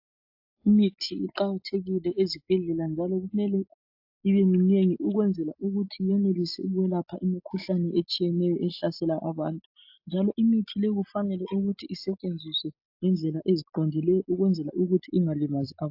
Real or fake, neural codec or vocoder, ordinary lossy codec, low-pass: real; none; AAC, 48 kbps; 5.4 kHz